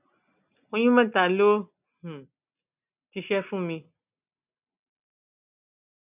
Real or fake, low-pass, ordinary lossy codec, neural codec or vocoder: real; 3.6 kHz; none; none